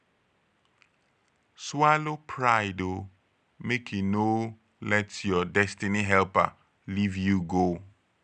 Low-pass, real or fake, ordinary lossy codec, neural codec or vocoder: 9.9 kHz; real; none; none